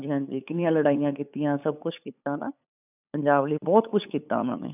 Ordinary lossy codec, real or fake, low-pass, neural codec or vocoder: none; fake; 3.6 kHz; codec, 16 kHz, 8 kbps, FunCodec, trained on LibriTTS, 25 frames a second